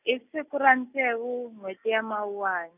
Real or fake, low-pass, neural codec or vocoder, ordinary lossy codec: real; 3.6 kHz; none; none